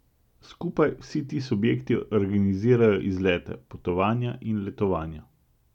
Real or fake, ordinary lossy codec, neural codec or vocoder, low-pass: real; none; none; 19.8 kHz